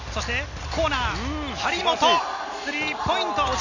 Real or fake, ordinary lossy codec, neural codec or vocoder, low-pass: real; none; none; 7.2 kHz